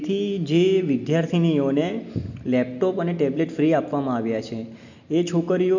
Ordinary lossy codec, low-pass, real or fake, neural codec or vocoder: none; 7.2 kHz; real; none